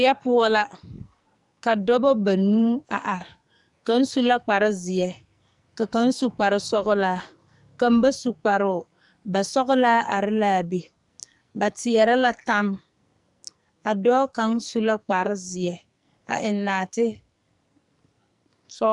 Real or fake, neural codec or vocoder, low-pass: fake; codec, 44.1 kHz, 2.6 kbps, SNAC; 10.8 kHz